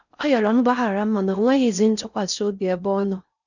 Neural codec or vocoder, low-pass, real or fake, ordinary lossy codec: codec, 16 kHz in and 24 kHz out, 0.6 kbps, FocalCodec, streaming, 4096 codes; 7.2 kHz; fake; none